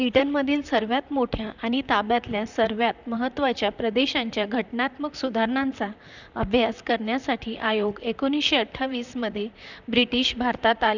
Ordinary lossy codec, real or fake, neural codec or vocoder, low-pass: none; fake; vocoder, 44.1 kHz, 128 mel bands, Pupu-Vocoder; 7.2 kHz